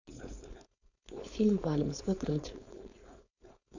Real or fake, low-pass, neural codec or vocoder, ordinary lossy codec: fake; 7.2 kHz; codec, 16 kHz, 4.8 kbps, FACodec; none